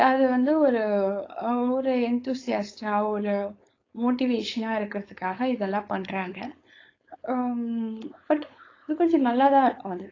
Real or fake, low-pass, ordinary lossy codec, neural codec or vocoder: fake; 7.2 kHz; AAC, 32 kbps; codec, 16 kHz, 4.8 kbps, FACodec